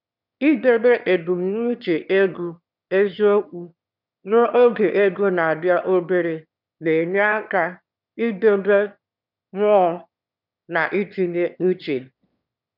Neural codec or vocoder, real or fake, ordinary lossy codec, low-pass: autoencoder, 22.05 kHz, a latent of 192 numbers a frame, VITS, trained on one speaker; fake; none; 5.4 kHz